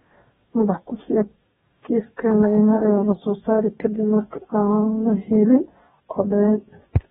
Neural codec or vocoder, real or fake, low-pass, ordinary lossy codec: codec, 44.1 kHz, 2.6 kbps, DAC; fake; 19.8 kHz; AAC, 16 kbps